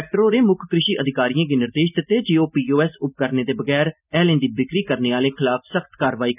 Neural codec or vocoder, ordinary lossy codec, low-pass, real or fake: none; none; 3.6 kHz; real